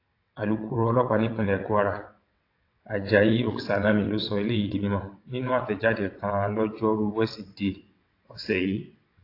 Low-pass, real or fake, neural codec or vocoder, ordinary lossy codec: 5.4 kHz; fake; vocoder, 22.05 kHz, 80 mel bands, WaveNeXt; AAC, 32 kbps